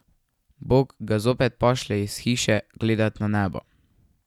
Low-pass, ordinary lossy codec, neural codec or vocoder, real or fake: 19.8 kHz; none; none; real